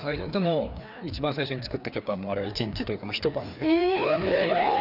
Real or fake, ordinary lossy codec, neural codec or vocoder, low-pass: fake; none; codec, 16 kHz, 2 kbps, FreqCodec, larger model; 5.4 kHz